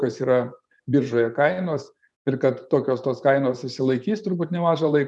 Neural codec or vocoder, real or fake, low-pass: vocoder, 24 kHz, 100 mel bands, Vocos; fake; 10.8 kHz